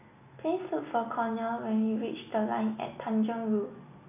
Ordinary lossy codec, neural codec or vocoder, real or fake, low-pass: none; none; real; 3.6 kHz